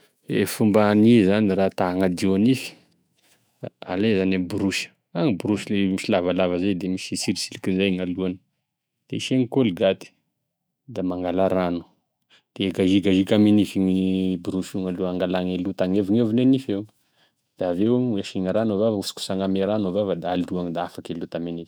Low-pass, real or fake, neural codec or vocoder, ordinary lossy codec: none; fake; autoencoder, 48 kHz, 128 numbers a frame, DAC-VAE, trained on Japanese speech; none